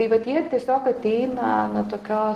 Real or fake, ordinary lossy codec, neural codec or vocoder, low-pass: real; Opus, 16 kbps; none; 14.4 kHz